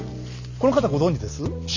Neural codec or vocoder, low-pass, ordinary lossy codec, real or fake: none; 7.2 kHz; none; real